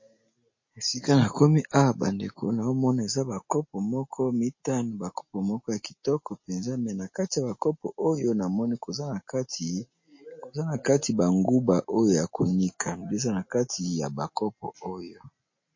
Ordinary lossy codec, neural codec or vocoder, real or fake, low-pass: MP3, 32 kbps; none; real; 7.2 kHz